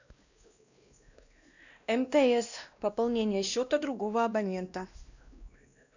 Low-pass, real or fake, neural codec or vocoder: 7.2 kHz; fake; codec, 16 kHz, 1 kbps, X-Codec, WavLM features, trained on Multilingual LibriSpeech